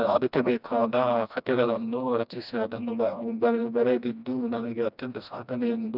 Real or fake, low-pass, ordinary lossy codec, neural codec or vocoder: fake; 5.4 kHz; none; codec, 16 kHz, 1 kbps, FreqCodec, smaller model